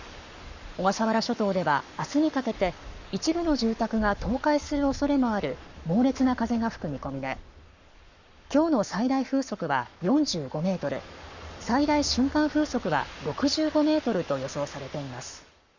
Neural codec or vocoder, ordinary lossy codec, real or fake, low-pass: codec, 44.1 kHz, 7.8 kbps, Pupu-Codec; none; fake; 7.2 kHz